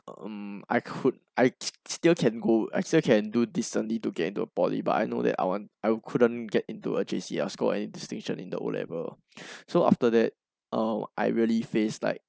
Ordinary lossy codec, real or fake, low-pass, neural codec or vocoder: none; real; none; none